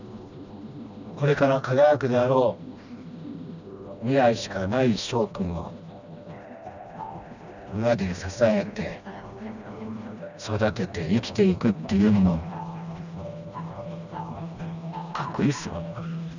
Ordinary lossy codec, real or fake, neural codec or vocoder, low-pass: none; fake; codec, 16 kHz, 1 kbps, FreqCodec, smaller model; 7.2 kHz